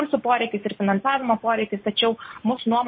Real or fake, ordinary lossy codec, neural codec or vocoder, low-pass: real; MP3, 24 kbps; none; 7.2 kHz